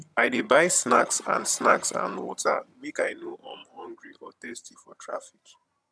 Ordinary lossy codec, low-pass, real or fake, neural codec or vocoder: none; none; fake; vocoder, 22.05 kHz, 80 mel bands, HiFi-GAN